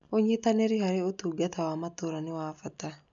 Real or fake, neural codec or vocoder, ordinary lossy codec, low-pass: real; none; none; 7.2 kHz